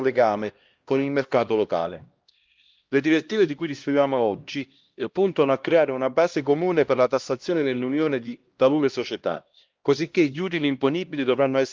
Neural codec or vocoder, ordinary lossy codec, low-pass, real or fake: codec, 16 kHz, 1 kbps, X-Codec, HuBERT features, trained on LibriSpeech; Opus, 32 kbps; 7.2 kHz; fake